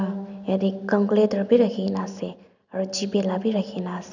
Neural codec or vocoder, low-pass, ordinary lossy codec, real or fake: none; 7.2 kHz; none; real